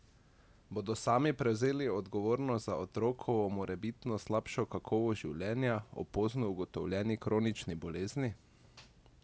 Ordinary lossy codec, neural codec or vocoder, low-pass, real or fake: none; none; none; real